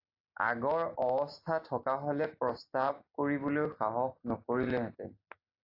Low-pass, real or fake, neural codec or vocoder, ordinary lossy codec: 5.4 kHz; real; none; AAC, 32 kbps